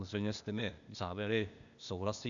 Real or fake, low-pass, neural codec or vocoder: fake; 7.2 kHz; codec, 16 kHz, 0.8 kbps, ZipCodec